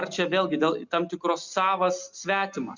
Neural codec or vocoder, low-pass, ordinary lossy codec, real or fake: none; 7.2 kHz; Opus, 64 kbps; real